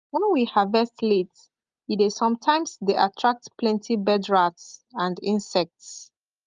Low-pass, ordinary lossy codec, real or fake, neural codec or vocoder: 7.2 kHz; Opus, 32 kbps; real; none